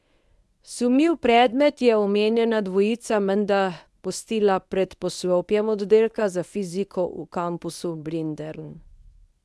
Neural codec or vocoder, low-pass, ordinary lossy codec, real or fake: codec, 24 kHz, 0.9 kbps, WavTokenizer, medium speech release version 1; none; none; fake